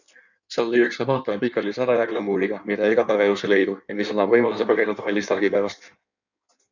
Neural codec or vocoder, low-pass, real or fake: codec, 16 kHz in and 24 kHz out, 1.1 kbps, FireRedTTS-2 codec; 7.2 kHz; fake